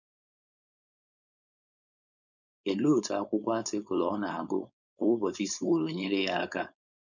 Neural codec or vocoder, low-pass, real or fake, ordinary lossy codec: codec, 16 kHz, 4.8 kbps, FACodec; 7.2 kHz; fake; none